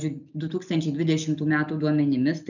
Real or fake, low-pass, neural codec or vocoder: real; 7.2 kHz; none